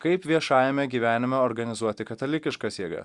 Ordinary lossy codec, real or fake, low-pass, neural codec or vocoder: Opus, 64 kbps; real; 10.8 kHz; none